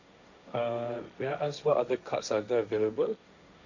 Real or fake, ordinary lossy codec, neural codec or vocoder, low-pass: fake; none; codec, 16 kHz, 1.1 kbps, Voila-Tokenizer; 7.2 kHz